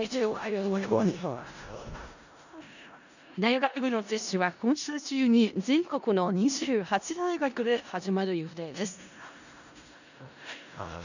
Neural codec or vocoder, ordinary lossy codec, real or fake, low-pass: codec, 16 kHz in and 24 kHz out, 0.4 kbps, LongCat-Audio-Codec, four codebook decoder; none; fake; 7.2 kHz